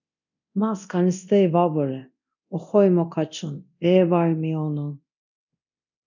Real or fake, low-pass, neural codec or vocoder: fake; 7.2 kHz; codec, 24 kHz, 0.9 kbps, DualCodec